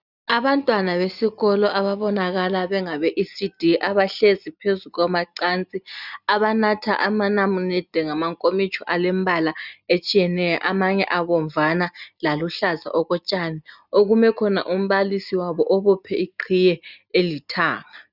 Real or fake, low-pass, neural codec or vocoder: real; 5.4 kHz; none